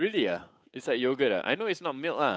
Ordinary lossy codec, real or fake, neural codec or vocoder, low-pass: none; fake; codec, 16 kHz, 8 kbps, FunCodec, trained on Chinese and English, 25 frames a second; none